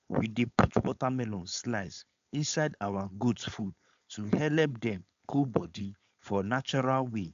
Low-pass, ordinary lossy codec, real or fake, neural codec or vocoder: 7.2 kHz; AAC, 64 kbps; fake; codec, 16 kHz, 4.8 kbps, FACodec